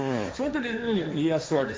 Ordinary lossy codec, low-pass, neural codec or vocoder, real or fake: none; none; codec, 16 kHz, 1.1 kbps, Voila-Tokenizer; fake